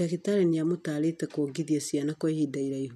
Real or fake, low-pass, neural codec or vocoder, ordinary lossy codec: real; 14.4 kHz; none; none